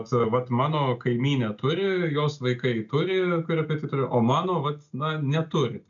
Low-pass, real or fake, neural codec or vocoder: 7.2 kHz; real; none